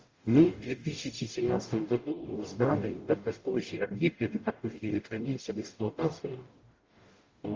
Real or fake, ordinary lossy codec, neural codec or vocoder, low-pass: fake; Opus, 32 kbps; codec, 44.1 kHz, 0.9 kbps, DAC; 7.2 kHz